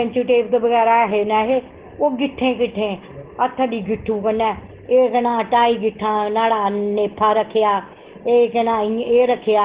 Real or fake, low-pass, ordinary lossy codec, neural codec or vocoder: real; 3.6 kHz; Opus, 16 kbps; none